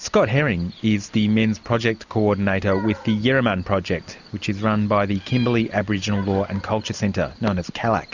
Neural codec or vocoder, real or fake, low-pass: none; real; 7.2 kHz